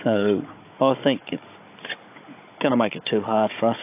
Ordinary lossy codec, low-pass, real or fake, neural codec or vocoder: AAC, 24 kbps; 3.6 kHz; fake; codec, 16 kHz, 16 kbps, FunCodec, trained on Chinese and English, 50 frames a second